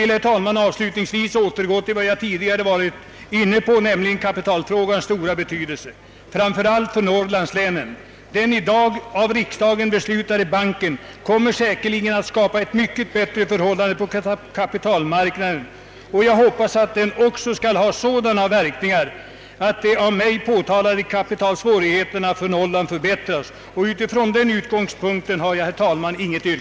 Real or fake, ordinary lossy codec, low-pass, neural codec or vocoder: real; none; none; none